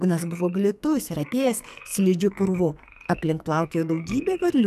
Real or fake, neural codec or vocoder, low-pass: fake; codec, 44.1 kHz, 2.6 kbps, SNAC; 14.4 kHz